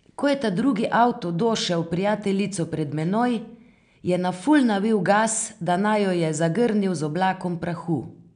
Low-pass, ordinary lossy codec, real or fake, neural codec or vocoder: 9.9 kHz; none; real; none